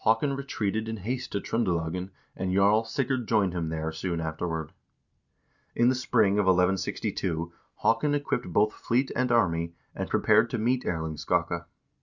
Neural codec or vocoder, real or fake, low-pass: none; real; 7.2 kHz